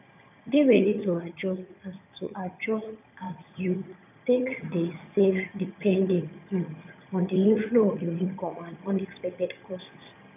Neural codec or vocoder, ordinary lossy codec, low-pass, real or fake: vocoder, 22.05 kHz, 80 mel bands, HiFi-GAN; none; 3.6 kHz; fake